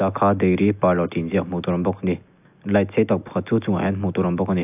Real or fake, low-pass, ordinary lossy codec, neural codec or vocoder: real; 3.6 kHz; AAC, 32 kbps; none